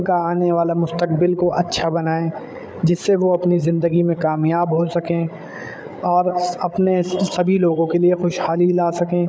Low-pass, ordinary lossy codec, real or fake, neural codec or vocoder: none; none; fake; codec, 16 kHz, 8 kbps, FreqCodec, larger model